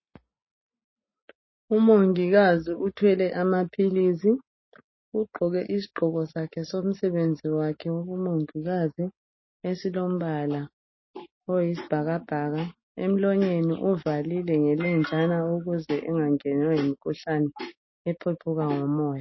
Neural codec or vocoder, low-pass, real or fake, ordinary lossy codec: none; 7.2 kHz; real; MP3, 24 kbps